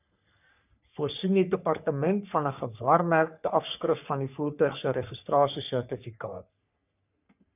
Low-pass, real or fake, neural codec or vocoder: 3.6 kHz; fake; codec, 44.1 kHz, 3.4 kbps, Pupu-Codec